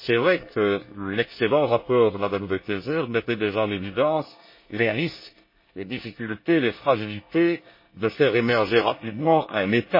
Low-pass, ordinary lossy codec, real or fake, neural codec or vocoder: 5.4 kHz; MP3, 24 kbps; fake; codec, 24 kHz, 1 kbps, SNAC